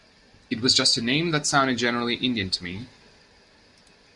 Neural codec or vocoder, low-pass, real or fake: none; 10.8 kHz; real